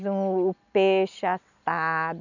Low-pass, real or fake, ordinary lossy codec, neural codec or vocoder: 7.2 kHz; real; none; none